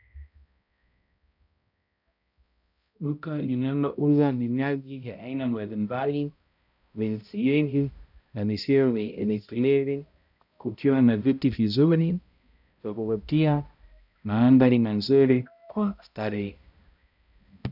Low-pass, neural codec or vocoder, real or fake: 5.4 kHz; codec, 16 kHz, 0.5 kbps, X-Codec, HuBERT features, trained on balanced general audio; fake